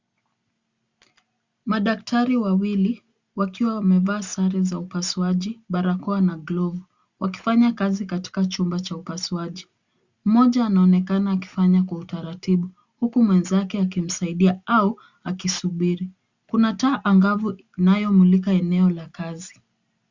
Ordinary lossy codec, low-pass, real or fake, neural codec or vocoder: Opus, 64 kbps; 7.2 kHz; real; none